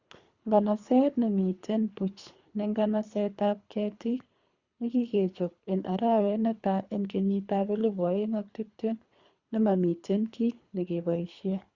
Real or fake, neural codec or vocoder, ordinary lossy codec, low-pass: fake; codec, 24 kHz, 3 kbps, HILCodec; Opus, 64 kbps; 7.2 kHz